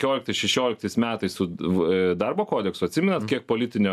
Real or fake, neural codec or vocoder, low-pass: real; none; 14.4 kHz